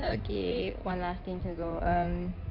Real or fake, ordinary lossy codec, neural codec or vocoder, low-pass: fake; none; codec, 16 kHz in and 24 kHz out, 2.2 kbps, FireRedTTS-2 codec; 5.4 kHz